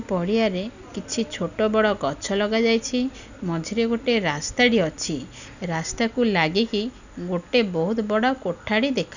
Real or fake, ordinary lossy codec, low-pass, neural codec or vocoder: real; none; 7.2 kHz; none